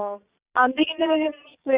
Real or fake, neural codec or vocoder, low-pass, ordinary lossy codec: fake; vocoder, 22.05 kHz, 80 mel bands, Vocos; 3.6 kHz; Opus, 64 kbps